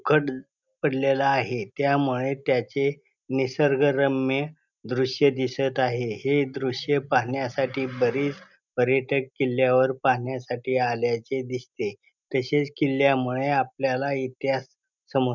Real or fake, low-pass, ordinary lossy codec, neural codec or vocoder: real; 7.2 kHz; none; none